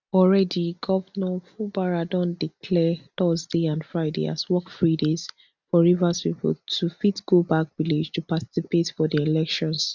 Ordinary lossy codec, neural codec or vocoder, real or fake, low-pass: none; none; real; 7.2 kHz